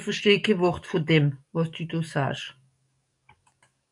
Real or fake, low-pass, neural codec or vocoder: fake; 10.8 kHz; codec, 44.1 kHz, 7.8 kbps, DAC